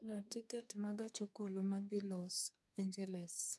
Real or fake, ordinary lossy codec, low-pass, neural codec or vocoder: fake; none; none; codec, 24 kHz, 1 kbps, SNAC